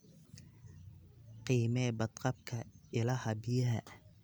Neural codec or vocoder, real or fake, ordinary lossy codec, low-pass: none; real; none; none